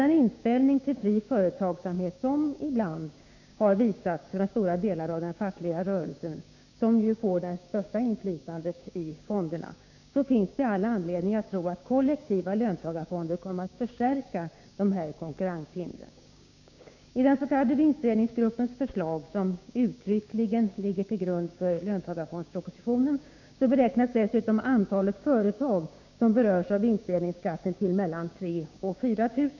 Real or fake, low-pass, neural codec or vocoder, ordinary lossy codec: fake; 7.2 kHz; codec, 16 kHz, 6 kbps, DAC; none